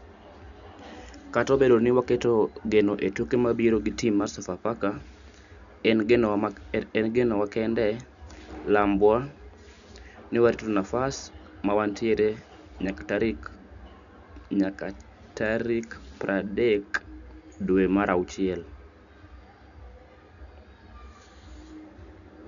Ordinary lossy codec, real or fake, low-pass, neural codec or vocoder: none; real; 7.2 kHz; none